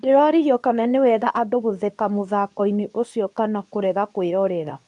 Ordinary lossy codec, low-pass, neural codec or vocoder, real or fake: none; 10.8 kHz; codec, 24 kHz, 0.9 kbps, WavTokenizer, medium speech release version 2; fake